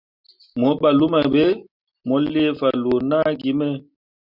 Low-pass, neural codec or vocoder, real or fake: 5.4 kHz; none; real